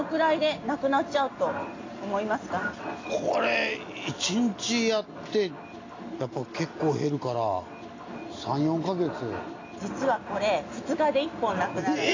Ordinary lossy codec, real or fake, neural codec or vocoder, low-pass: AAC, 32 kbps; real; none; 7.2 kHz